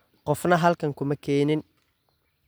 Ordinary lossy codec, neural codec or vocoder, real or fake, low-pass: none; none; real; none